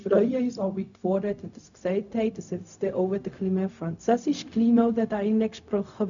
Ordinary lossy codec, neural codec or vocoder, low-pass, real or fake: none; codec, 16 kHz, 0.4 kbps, LongCat-Audio-Codec; 7.2 kHz; fake